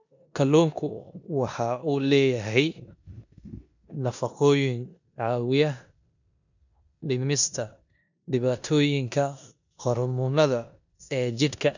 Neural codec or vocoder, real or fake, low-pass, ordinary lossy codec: codec, 16 kHz in and 24 kHz out, 0.9 kbps, LongCat-Audio-Codec, four codebook decoder; fake; 7.2 kHz; none